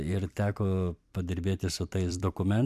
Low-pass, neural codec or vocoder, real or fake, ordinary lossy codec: 14.4 kHz; vocoder, 44.1 kHz, 128 mel bands every 256 samples, BigVGAN v2; fake; MP3, 96 kbps